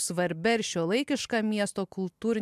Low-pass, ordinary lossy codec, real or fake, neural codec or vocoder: 14.4 kHz; MP3, 96 kbps; real; none